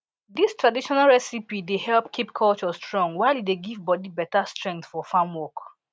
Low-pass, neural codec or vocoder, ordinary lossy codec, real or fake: none; none; none; real